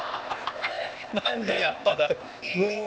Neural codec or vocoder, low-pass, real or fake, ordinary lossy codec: codec, 16 kHz, 0.8 kbps, ZipCodec; none; fake; none